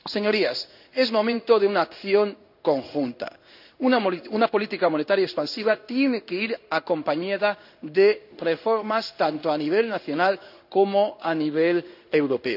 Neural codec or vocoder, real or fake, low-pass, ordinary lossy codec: codec, 16 kHz in and 24 kHz out, 1 kbps, XY-Tokenizer; fake; 5.4 kHz; none